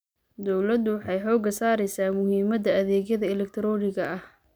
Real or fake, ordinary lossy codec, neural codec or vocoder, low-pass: real; none; none; none